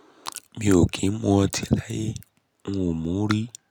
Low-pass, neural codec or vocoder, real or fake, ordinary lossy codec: 19.8 kHz; none; real; none